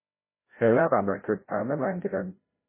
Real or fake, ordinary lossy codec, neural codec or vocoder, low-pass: fake; MP3, 16 kbps; codec, 16 kHz, 0.5 kbps, FreqCodec, larger model; 3.6 kHz